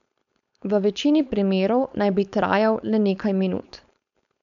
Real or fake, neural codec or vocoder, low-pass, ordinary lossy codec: fake; codec, 16 kHz, 4.8 kbps, FACodec; 7.2 kHz; none